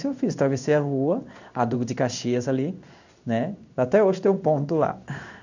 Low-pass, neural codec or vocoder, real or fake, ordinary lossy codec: 7.2 kHz; codec, 16 kHz in and 24 kHz out, 1 kbps, XY-Tokenizer; fake; none